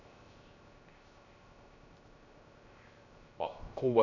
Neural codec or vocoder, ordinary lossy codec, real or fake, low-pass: codec, 16 kHz, 0.3 kbps, FocalCodec; none; fake; 7.2 kHz